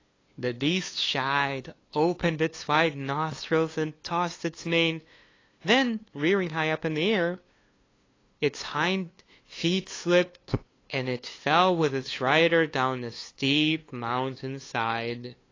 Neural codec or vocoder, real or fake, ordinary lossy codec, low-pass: codec, 16 kHz, 2 kbps, FunCodec, trained on LibriTTS, 25 frames a second; fake; AAC, 32 kbps; 7.2 kHz